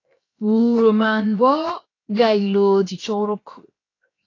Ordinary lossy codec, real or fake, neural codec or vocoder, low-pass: AAC, 32 kbps; fake; codec, 16 kHz, 0.7 kbps, FocalCodec; 7.2 kHz